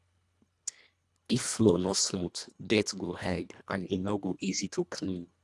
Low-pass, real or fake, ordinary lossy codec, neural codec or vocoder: none; fake; none; codec, 24 kHz, 1.5 kbps, HILCodec